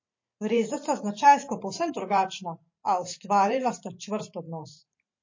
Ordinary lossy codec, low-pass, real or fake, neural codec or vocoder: MP3, 32 kbps; 7.2 kHz; fake; vocoder, 44.1 kHz, 128 mel bands every 512 samples, BigVGAN v2